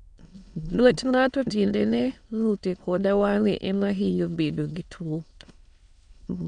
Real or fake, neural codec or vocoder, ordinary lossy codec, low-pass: fake; autoencoder, 22.05 kHz, a latent of 192 numbers a frame, VITS, trained on many speakers; none; 9.9 kHz